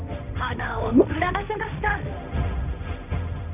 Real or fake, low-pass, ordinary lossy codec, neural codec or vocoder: fake; 3.6 kHz; none; codec, 16 kHz, 1.1 kbps, Voila-Tokenizer